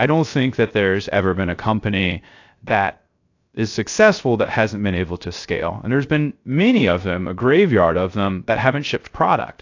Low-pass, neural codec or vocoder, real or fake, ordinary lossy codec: 7.2 kHz; codec, 16 kHz, 0.3 kbps, FocalCodec; fake; AAC, 48 kbps